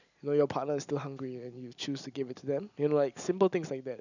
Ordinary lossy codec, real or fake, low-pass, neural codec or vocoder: none; real; 7.2 kHz; none